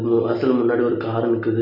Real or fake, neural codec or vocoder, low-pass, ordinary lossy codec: real; none; 5.4 kHz; none